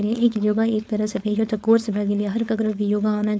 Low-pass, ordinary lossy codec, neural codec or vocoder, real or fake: none; none; codec, 16 kHz, 4.8 kbps, FACodec; fake